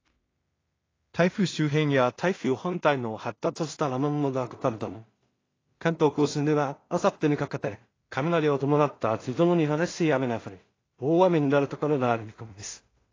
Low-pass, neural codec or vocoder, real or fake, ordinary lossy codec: 7.2 kHz; codec, 16 kHz in and 24 kHz out, 0.4 kbps, LongCat-Audio-Codec, two codebook decoder; fake; AAC, 32 kbps